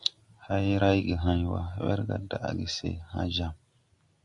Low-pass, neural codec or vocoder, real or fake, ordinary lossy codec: 10.8 kHz; none; real; MP3, 64 kbps